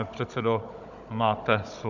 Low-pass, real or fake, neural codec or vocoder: 7.2 kHz; fake; codec, 16 kHz, 16 kbps, FunCodec, trained on Chinese and English, 50 frames a second